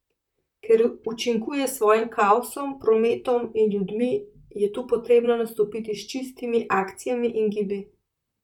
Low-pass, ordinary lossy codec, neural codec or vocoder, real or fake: 19.8 kHz; none; vocoder, 44.1 kHz, 128 mel bands, Pupu-Vocoder; fake